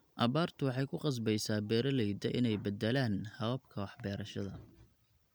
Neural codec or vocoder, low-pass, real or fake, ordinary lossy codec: none; none; real; none